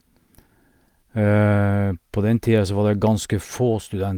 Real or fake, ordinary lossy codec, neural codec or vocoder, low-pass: real; Opus, 32 kbps; none; 19.8 kHz